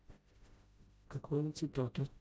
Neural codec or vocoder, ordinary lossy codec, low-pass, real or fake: codec, 16 kHz, 0.5 kbps, FreqCodec, smaller model; none; none; fake